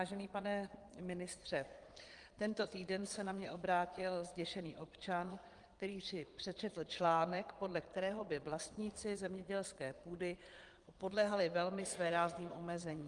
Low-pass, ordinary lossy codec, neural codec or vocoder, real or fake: 9.9 kHz; Opus, 24 kbps; vocoder, 22.05 kHz, 80 mel bands, Vocos; fake